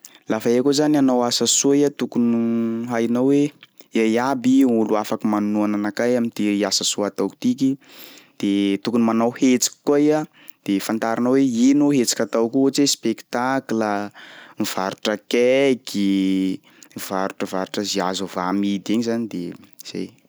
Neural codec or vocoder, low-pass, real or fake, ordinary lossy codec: none; none; real; none